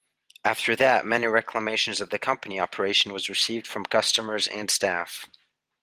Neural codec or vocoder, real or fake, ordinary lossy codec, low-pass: none; real; Opus, 24 kbps; 14.4 kHz